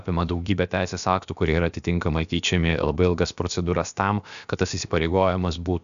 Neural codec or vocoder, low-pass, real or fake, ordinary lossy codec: codec, 16 kHz, about 1 kbps, DyCAST, with the encoder's durations; 7.2 kHz; fake; MP3, 96 kbps